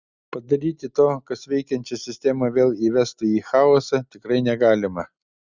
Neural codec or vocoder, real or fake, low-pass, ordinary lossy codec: none; real; 7.2 kHz; Opus, 64 kbps